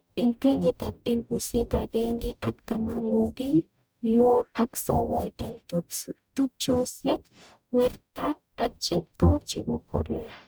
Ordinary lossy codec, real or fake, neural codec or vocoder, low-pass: none; fake; codec, 44.1 kHz, 0.9 kbps, DAC; none